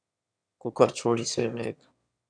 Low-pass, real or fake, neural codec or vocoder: 9.9 kHz; fake; autoencoder, 22.05 kHz, a latent of 192 numbers a frame, VITS, trained on one speaker